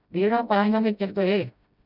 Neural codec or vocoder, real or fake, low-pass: codec, 16 kHz, 0.5 kbps, FreqCodec, smaller model; fake; 5.4 kHz